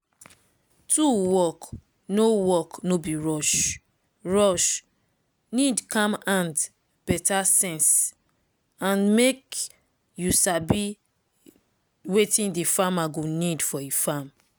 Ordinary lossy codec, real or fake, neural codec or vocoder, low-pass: none; real; none; none